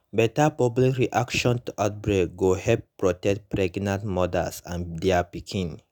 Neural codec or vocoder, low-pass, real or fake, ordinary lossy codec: none; none; real; none